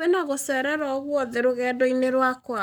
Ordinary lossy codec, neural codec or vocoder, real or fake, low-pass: none; codec, 44.1 kHz, 7.8 kbps, Pupu-Codec; fake; none